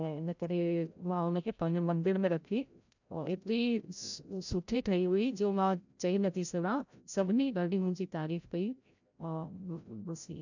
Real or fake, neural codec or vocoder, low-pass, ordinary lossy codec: fake; codec, 16 kHz, 0.5 kbps, FreqCodec, larger model; 7.2 kHz; none